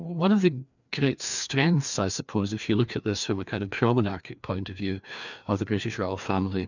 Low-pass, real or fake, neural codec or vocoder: 7.2 kHz; fake; codec, 16 kHz, 2 kbps, FreqCodec, larger model